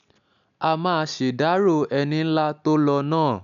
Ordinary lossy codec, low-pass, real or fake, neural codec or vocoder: none; 7.2 kHz; real; none